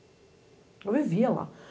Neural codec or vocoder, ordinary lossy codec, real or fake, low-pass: none; none; real; none